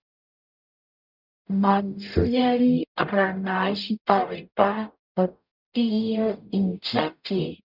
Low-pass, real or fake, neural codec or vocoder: 5.4 kHz; fake; codec, 44.1 kHz, 0.9 kbps, DAC